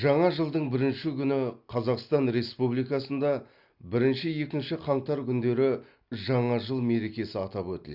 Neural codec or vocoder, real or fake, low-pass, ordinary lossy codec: none; real; 5.4 kHz; Opus, 64 kbps